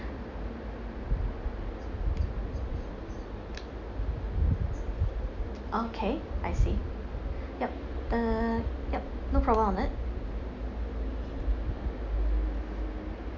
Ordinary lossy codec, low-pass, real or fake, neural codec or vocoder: none; 7.2 kHz; real; none